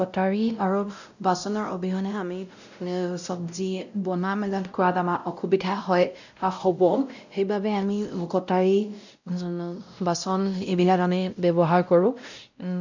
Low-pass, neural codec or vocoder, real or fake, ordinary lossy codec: 7.2 kHz; codec, 16 kHz, 0.5 kbps, X-Codec, WavLM features, trained on Multilingual LibriSpeech; fake; none